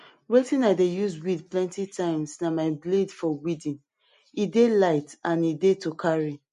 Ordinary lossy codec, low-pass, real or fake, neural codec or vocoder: MP3, 48 kbps; 10.8 kHz; real; none